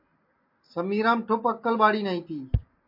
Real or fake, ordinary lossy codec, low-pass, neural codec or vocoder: real; MP3, 32 kbps; 5.4 kHz; none